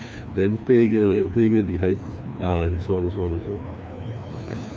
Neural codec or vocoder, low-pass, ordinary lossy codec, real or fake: codec, 16 kHz, 2 kbps, FreqCodec, larger model; none; none; fake